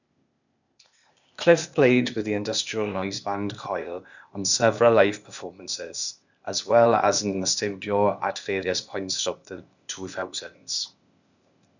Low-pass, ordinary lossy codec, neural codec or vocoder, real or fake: 7.2 kHz; none; codec, 16 kHz, 0.8 kbps, ZipCodec; fake